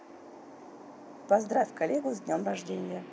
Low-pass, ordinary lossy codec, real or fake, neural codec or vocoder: none; none; real; none